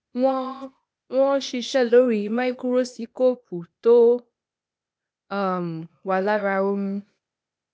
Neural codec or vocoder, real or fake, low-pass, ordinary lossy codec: codec, 16 kHz, 0.8 kbps, ZipCodec; fake; none; none